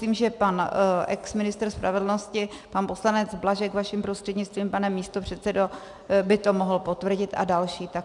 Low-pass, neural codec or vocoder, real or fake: 10.8 kHz; none; real